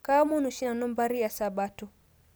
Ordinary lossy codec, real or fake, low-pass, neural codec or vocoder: none; real; none; none